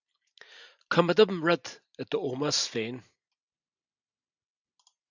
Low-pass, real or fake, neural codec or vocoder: 7.2 kHz; real; none